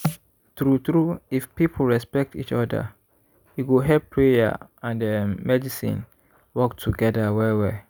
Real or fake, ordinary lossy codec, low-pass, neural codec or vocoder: real; none; none; none